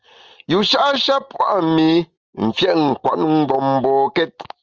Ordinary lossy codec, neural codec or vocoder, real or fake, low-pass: Opus, 24 kbps; none; real; 7.2 kHz